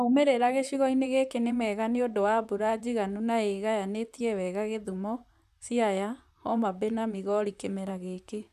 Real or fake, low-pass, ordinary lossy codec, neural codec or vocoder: fake; 14.4 kHz; none; vocoder, 44.1 kHz, 128 mel bands, Pupu-Vocoder